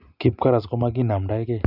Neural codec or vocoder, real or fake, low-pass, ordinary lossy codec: none; real; 5.4 kHz; none